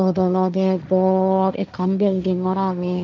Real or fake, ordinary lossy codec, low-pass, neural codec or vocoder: fake; none; none; codec, 16 kHz, 1.1 kbps, Voila-Tokenizer